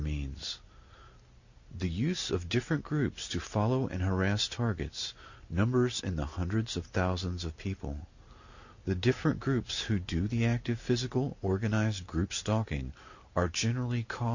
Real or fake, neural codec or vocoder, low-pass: real; none; 7.2 kHz